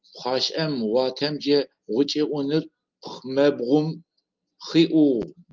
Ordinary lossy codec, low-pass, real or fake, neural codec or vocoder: Opus, 24 kbps; 7.2 kHz; real; none